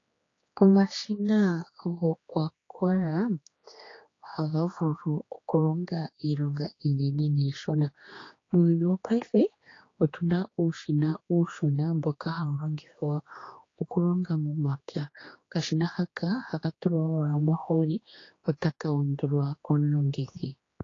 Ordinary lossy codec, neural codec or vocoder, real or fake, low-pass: AAC, 32 kbps; codec, 16 kHz, 2 kbps, X-Codec, HuBERT features, trained on general audio; fake; 7.2 kHz